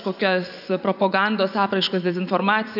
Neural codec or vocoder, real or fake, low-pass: vocoder, 24 kHz, 100 mel bands, Vocos; fake; 5.4 kHz